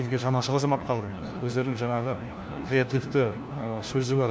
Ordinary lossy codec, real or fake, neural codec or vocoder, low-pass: none; fake; codec, 16 kHz, 1 kbps, FunCodec, trained on LibriTTS, 50 frames a second; none